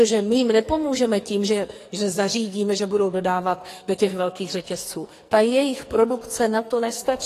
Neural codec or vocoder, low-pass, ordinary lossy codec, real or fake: codec, 44.1 kHz, 2.6 kbps, SNAC; 14.4 kHz; AAC, 48 kbps; fake